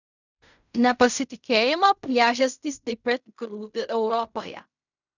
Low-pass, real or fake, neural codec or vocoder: 7.2 kHz; fake; codec, 16 kHz in and 24 kHz out, 0.4 kbps, LongCat-Audio-Codec, fine tuned four codebook decoder